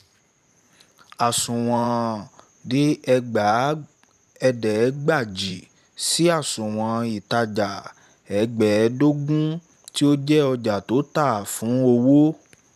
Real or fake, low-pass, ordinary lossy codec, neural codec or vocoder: fake; 14.4 kHz; none; vocoder, 44.1 kHz, 128 mel bands every 512 samples, BigVGAN v2